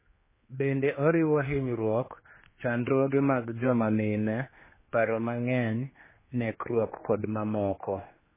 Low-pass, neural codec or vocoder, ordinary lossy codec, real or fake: 3.6 kHz; codec, 16 kHz, 2 kbps, X-Codec, HuBERT features, trained on general audio; MP3, 16 kbps; fake